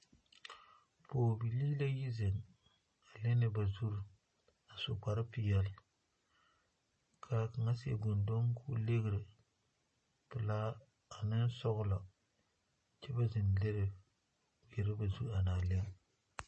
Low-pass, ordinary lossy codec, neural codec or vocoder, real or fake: 10.8 kHz; MP3, 32 kbps; none; real